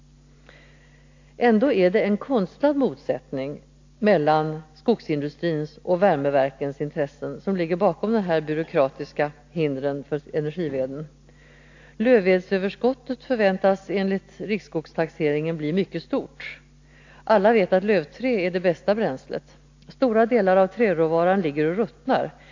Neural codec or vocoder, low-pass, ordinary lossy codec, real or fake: none; 7.2 kHz; AAC, 48 kbps; real